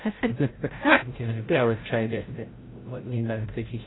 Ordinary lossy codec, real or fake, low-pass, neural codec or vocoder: AAC, 16 kbps; fake; 7.2 kHz; codec, 16 kHz, 0.5 kbps, FreqCodec, larger model